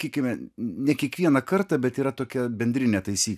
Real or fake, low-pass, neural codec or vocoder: real; 14.4 kHz; none